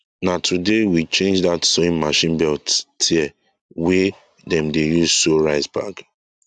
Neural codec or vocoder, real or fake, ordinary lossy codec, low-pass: none; real; none; 9.9 kHz